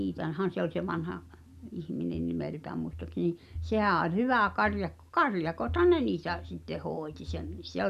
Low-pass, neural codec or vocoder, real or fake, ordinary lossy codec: 14.4 kHz; none; real; none